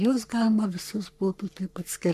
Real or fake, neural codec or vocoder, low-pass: fake; codec, 44.1 kHz, 3.4 kbps, Pupu-Codec; 14.4 kHz